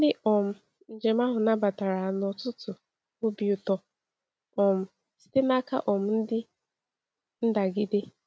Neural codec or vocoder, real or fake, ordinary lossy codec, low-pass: none; real; none; none